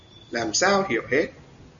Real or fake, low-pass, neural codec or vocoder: real; 7.2 kHz; none